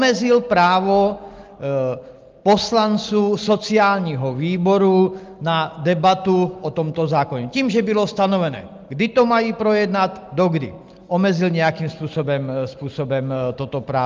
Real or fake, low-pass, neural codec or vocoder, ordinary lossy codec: real; 7.2 kHz; none; Opus, 32 kbps